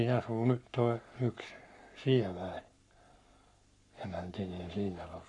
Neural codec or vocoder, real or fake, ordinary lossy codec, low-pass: codec, 44.1 kHz, 7.8 kbps, Pupu-Codec; fake; none; 10.8 kHz